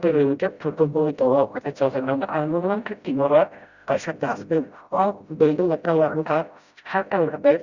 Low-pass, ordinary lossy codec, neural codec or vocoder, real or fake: 7.2 kHz; Opus, 64 kbps; codec, 16 kHz, 0.5 kbps, FreqCodec, smaller model; fake